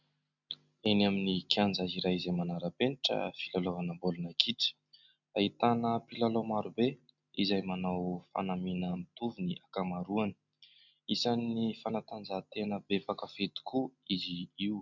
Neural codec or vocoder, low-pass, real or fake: none; 7.2 kHz; real